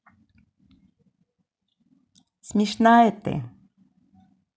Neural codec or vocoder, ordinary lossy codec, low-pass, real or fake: none; none; none; real